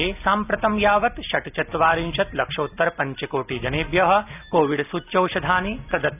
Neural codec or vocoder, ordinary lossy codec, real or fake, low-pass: none; none; real; 3.6 kHz